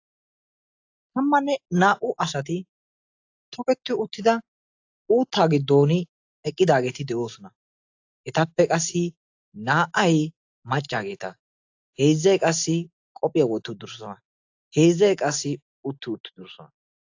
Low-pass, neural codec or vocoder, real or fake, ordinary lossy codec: 7.2 kHz; none; real; AAC, 48 kbps